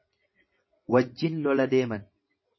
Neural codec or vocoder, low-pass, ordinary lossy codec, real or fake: vocoder, 22.05 kHz, 80 mel bands, WaveNeXt; 7.2 kHz; MP3, 24 kbps; fake